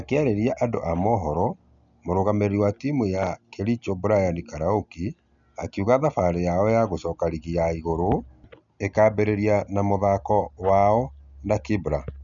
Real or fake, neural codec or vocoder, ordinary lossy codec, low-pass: real; none; none; 7.2 kHz